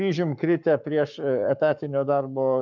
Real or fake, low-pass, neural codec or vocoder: fake; 7.2 kHz; codec, 44.1 kHz, 7.8 kbps, Pupu-Codec